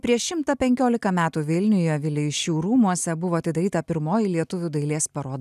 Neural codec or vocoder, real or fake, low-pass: none; real; 14.4 kHz